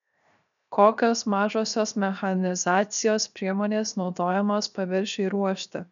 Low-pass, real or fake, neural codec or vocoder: 7.2 kHz; fake; codec, 16 kHz, 0.7 kbps, FocalCodec